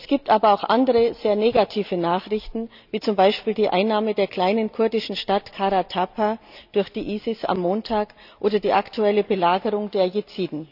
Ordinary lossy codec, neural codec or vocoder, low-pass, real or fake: none; none; 5.4 kHz; real